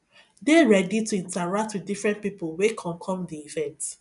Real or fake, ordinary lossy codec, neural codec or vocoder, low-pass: real; none; none; 10.8 kHz